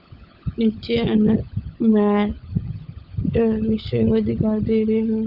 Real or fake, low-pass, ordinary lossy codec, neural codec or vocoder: fake; 5.4 kHz; none; codec, 16 kHz, 16 kbps, FunCodec, trained on LibriTTS, 50 frames a second